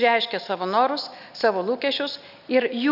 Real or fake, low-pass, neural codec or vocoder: real; 5.4 kHz; none